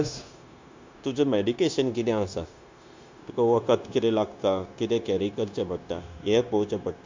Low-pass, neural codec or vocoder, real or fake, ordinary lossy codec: 7.2 kHz; codec, 16 kHz, 0.9 kbps, LongCat-Audio-Codec; fake; MP3, 64 kbps